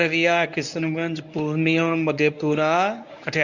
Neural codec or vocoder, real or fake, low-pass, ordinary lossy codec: codec, 24 kHz, 0.9 kbps, WavTokenizer, medium speech release version 1; fake; 7.2 kHz; none